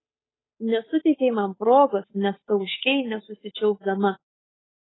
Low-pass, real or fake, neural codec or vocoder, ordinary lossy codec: 7.2 kHz; fake; codec, 16 kHz, 8 kbps, FunCodec, trained on Chinese and English, 25 frames a second; AAC, 16 kbps